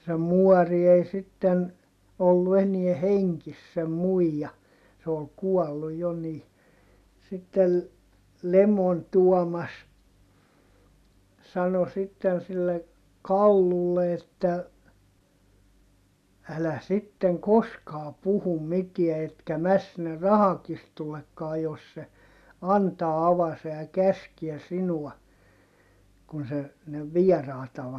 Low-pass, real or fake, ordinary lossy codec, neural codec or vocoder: 14.4 kHz; real; none; none